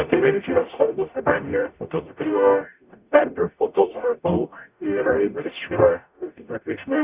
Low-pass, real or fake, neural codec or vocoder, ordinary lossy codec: 3.6 kHz; fake; codec, 44.1 kHz, 0.9 kbps, DAC; Opus, 64 kbps